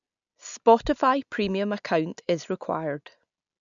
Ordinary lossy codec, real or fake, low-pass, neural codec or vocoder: none; real; 7.2 kHz; none